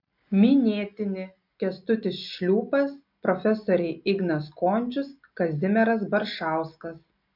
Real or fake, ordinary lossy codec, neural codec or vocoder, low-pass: real; MP3, 48 kbps; none; 5.4 kHz